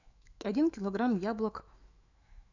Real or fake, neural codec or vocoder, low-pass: fake; autoencoder, 48 kHz, 128 numbers a frame, DAC-VAE, trained on Japanese speech; 7.2 kHz